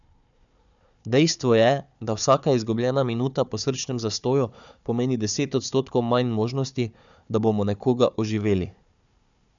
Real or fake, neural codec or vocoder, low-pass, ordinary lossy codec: fake; codec, 16 kHz, 4 kbps, FunCodec, trained on Chinese and English, 50 frames a second; 7.2 kHz; none